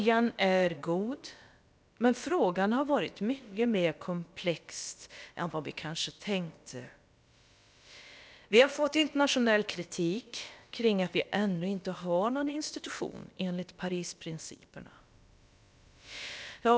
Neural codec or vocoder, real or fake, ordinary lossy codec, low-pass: codec, 16 kHz, about 1 kbps, DyCAST, with the encoder's durations; fake; none; none